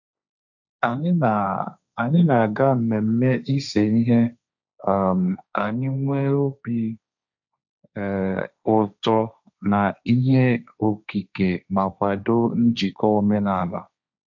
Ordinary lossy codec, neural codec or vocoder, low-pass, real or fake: none; codec, 16 kHz, 1.1 kbps, Voila-Tokenizer; 7.2 kHz; fake